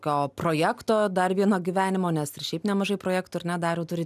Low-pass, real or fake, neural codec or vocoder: 14.4 kHz; real; none